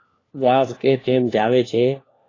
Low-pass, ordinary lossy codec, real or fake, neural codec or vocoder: 7.2 kHz; AAC, 32 kbps; fake; codec, 16 kHz, 1 kbps, FunCodec, trained on LibriTTS, 50 frames a second